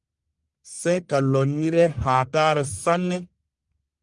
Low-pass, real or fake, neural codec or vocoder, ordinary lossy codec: 10.8 kHz; fake; codec, 44.1 kHz, 1.7 kbps, Pupu-Codec; Opus, 32 kbps